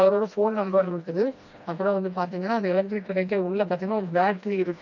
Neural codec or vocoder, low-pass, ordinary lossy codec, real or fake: codec, 16 kHz, 1 kbps, FreqCodec, smaller model; 7.2 kHz; none; fake